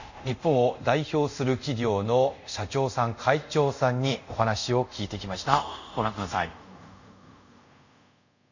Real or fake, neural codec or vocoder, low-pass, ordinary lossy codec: fake; codec, 24 kHz, 0.5 kbps, DualCodec; 7.2 kHz; none